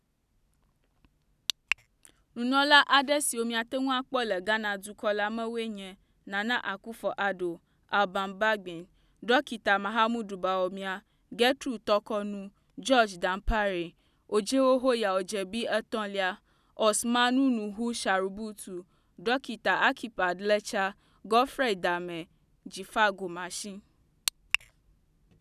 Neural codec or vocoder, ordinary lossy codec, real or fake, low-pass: none; none; real; 14.4 kHz